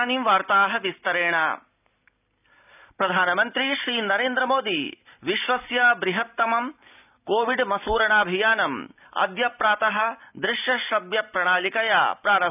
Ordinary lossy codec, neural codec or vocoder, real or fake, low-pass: none; none; real; 3.6 kHz